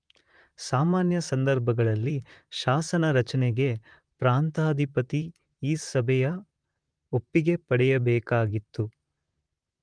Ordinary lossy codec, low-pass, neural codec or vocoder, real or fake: Opus, 32 kbps; 9.9 kHz; vocoder, 44.1 kHz, 128 mel bands, Pupu-Vocoder; fake